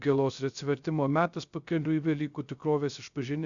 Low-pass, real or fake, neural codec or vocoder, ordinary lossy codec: 7.2 kHz; fake; codec, 16 kHz, 0.3 kbps, FocalCodec; MP3, 96 kbps